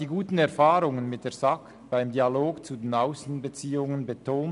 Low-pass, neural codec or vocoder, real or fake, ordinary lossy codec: 10.8 kHz; none; real; MP3, 96 kbps